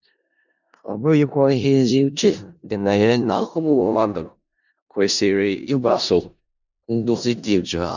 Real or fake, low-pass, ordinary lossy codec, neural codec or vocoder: fake; 7.2 kHz; none; codec, 16 kHz in and 24 kHz out, 0.4 kbps, LongCat-Audio-Codec, four codebook decoder